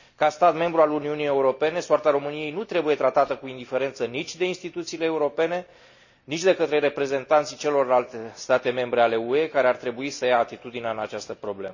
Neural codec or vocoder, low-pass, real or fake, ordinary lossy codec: none; 7.2 kHz; real; none